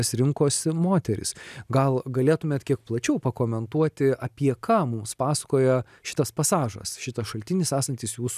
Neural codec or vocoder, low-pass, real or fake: none; 14.4 kHz; real